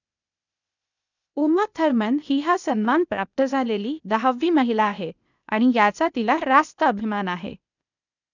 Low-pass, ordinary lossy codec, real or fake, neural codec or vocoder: 7.2 kHz; none; fake; codec, 16 kHz, 0.8 kbps, ZipCodec